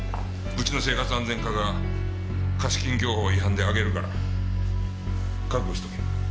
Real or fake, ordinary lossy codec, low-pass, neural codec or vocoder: real; none; none; none